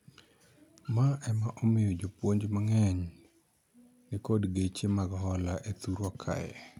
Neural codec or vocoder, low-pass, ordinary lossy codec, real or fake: none; 19.8 kHz; none; real